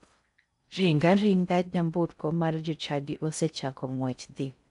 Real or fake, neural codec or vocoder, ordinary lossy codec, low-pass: fake; codec, 16 kHz in and 24 kHz out, 0.6 kbps, FocalCodec, streaming, 4096 codes; none; 10.8 kHz